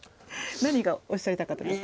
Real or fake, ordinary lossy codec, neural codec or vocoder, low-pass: real; none; none; none